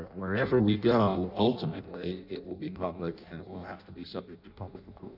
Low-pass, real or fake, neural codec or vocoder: 5.4 kHz; fake; codec, 16 kHz in and 24 kHz out, 0.6 kbps, FireRedTTS-2 codec